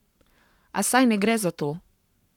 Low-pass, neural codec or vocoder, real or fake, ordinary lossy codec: 19.8 kHz; codec, 44.1 kHz, 7.8 kbps, Pupu-Codec; fake; none